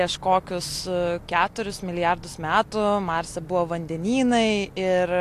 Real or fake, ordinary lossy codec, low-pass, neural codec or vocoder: real; AAC, 64 kbps; 14.4 kHz; none